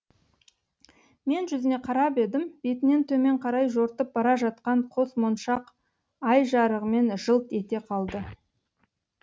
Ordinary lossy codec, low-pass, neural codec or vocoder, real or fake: none; none; none; real